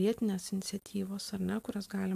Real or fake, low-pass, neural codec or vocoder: real; 14.4 kHz; none